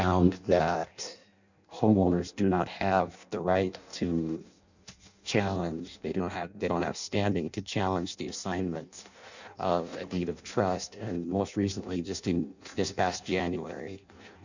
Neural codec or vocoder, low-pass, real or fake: codec, 16 kHz in and 24 kHz out, 0.6 kbps, FireRedTTS-2 codec; 7.2 kHz; fake